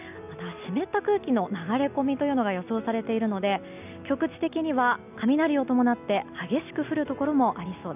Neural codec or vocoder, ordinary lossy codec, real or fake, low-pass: none; none; real; 3.6 kHz